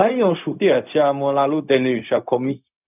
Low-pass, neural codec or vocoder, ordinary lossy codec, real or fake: 3.6 kHz; codec, 16 kHz, 0.4 kbps, LongCat-Audio-Codec; none; fake